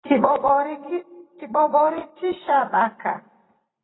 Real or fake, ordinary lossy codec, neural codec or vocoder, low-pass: fake; AAC, 16 kbps; vocoder, 44.1 kHz, 128 mel bands every 512 samples, BigVGAN v2; 7.2 kHz